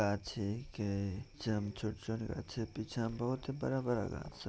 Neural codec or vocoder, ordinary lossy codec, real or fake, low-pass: none; none; real; none